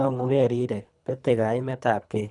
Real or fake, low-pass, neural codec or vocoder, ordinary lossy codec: fake; 10.8 kHz; codec, 24 kHz, 3 kbps, HILCodec; none